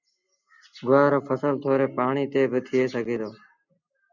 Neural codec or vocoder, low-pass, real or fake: none; 7.2 kHz; real